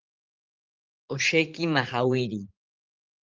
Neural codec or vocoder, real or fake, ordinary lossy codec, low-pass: vocoder, 44.1 kHz, 80 mel bands, Vocos; fake; Opus, 16 kbps; 7.2 kHz